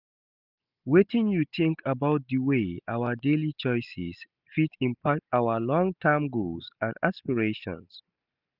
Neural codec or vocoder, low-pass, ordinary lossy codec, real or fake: none; 5.4 kHz; none; real